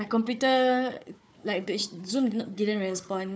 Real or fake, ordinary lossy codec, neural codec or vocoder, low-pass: fake; none; codec, 16 kHz, 4 kbps, FunCodec, trained on Chinese and English, 50 frames a second; none